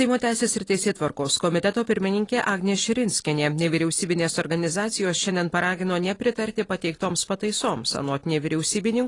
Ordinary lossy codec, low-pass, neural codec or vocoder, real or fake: AAC, 32 kbps; 10.8 kHz; none; real